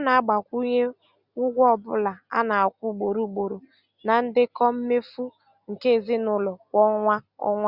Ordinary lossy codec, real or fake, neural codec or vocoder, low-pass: Opus, 64 kbps; real; none; 5.4 kHz